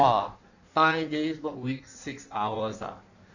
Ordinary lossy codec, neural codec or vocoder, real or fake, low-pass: none; codec, 16 kHz in and 24 kHz out, 1.1 kbps, FireRedTTS-2 codec; fake; 7.2 kHz